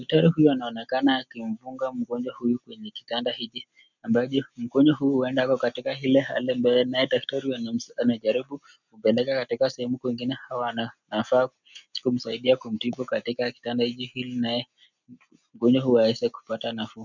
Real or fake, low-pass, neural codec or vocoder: real; 7.2 kHz; none